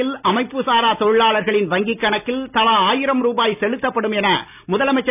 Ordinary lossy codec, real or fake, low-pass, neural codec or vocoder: none; real; 3.6 kHz; none